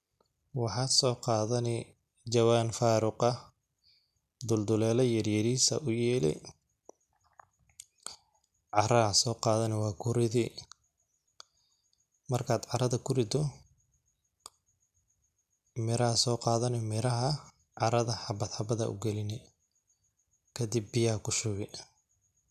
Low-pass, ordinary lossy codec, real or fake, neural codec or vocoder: 14.4 kHz; none; real; none